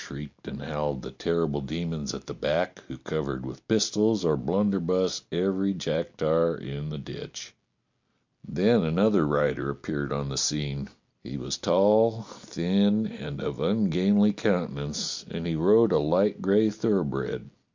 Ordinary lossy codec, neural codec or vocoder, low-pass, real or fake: MP3, 64 kbps; none; 7.2 kHz; real